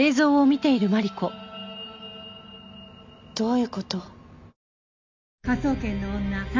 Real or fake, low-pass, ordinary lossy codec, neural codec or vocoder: real; 7.2 kHz; MP3, 64 kbps; none